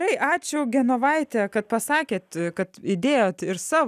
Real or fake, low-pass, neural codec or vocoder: real; 14.4 kHz; none